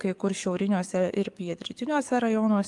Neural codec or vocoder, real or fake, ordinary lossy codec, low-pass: codec, 44.1 kHz, 7.8 kbps, DAC; fake; Opus, 32 kbps; 10.8 kHz